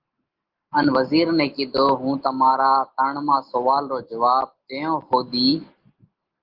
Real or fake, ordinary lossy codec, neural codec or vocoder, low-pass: real; Opus, 16 kbps; none; 5.4 kHz